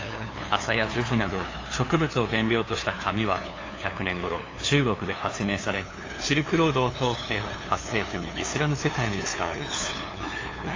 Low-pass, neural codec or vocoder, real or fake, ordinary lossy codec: 7.2 kHz; codec, 16 kHz, 2 kbps, FunCodec, trained on LibriTTS, 25 frames a second; fake; AAC, 32 kbps